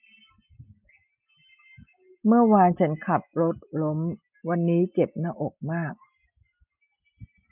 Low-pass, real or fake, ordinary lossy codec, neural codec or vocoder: 3.6 kHz; real; none; none